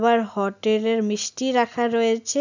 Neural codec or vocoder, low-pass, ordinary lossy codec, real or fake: none; 7.2 kHz; none; real